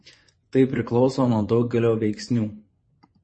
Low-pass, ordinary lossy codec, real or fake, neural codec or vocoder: 9.9 kHz; MP3, 32 kbps; fake; vocoder, 24 kHz, 100 mel bands, Vocos